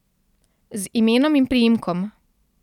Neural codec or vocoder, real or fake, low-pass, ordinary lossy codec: none; real; 19.8 kHz; none